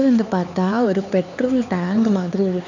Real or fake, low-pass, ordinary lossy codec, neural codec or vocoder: fake; 7.2 kHz; none; codec, 16 kHz, 4 kbps, X-Codec, HuBERT features, trained on LibriSpeech